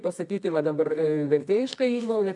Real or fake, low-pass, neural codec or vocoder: fake; 10.8 kHz; codec, 24 kHz, 0.9 kbps, WavTokenizer, medium music audio release